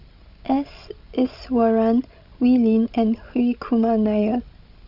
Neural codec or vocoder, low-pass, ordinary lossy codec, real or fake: codec, 16 kHz, 16 kbps, FreqCodec, larger model; 5.4 kHz; none; fake